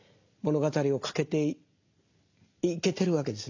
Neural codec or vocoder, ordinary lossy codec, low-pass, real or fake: none; AAC, 48 kbps; 7.2 kHz; real